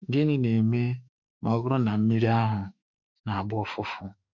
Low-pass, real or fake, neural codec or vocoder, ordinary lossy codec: 7.2 kHz; fake; autoencoder, 48 kHz, 32 numbers a frame, DAC-VAE, trained on Japanese speech; none